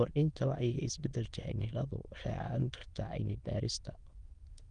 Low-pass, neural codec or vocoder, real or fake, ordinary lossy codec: 9.9 kHz; autoencoder, 22.05 kHz, a latent of 192 numbers a frame, VITS, trained on many speakers; fake; Opus, 24 kbps